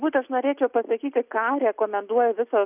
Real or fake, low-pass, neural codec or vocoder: real; 3.6 kHz; none